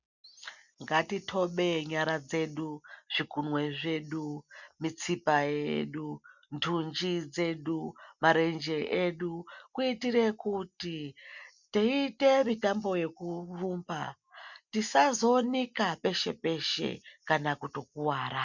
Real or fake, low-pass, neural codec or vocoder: real; 7.2 kHz; none